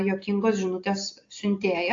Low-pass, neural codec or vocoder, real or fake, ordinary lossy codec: 7.2 kHz; none; real; AAC, 48 kbps